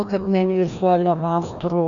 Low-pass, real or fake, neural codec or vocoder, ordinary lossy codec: 7.2 kHz; fake; codec, 16 kHz, 1 kbps, FreqCodec, larger model; MP3, 96 kbps